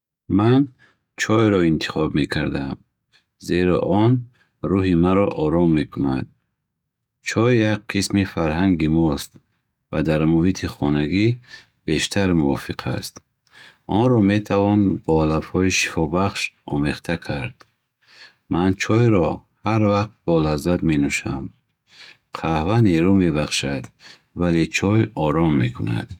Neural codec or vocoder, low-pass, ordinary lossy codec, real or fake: codec, 44.1 kHz, 7.8 kbps, DAC; 19.8 kHz; none; fake